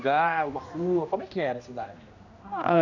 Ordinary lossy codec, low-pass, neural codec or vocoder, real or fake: none; 7.2 kHz; codec, 16 kHz, 1 kbps, X-Codec, HuBERT features, trained on general audio; fake